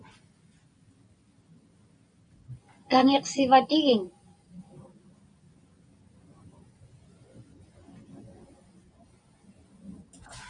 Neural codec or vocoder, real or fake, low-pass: none; real; 9.9 kHz